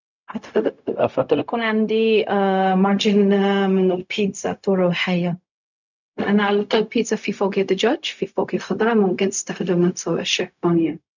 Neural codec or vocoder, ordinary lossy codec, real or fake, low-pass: codec, 16 kHz, 0.4 kbps, LongCat-Audio-Codec; none; fake; 7.2 kHz